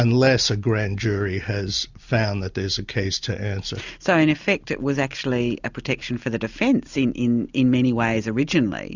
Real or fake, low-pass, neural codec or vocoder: real; 7.2 kHz; none